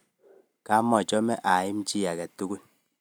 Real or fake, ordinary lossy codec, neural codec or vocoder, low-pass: real; none; none; none